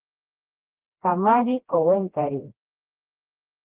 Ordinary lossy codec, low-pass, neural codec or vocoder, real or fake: Opus, 16 kbps; 3.6 kHz; codec, 16 kHz, 1 kbps, FreqCodec, smaller model; fake